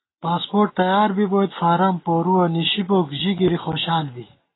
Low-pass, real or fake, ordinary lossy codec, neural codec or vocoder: 7.2 kHz; real; AAC, 16 kbps; none